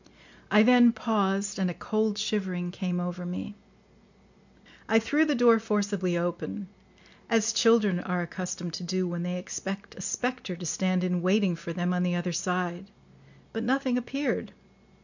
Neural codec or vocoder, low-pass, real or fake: none; 7.2 kHz; real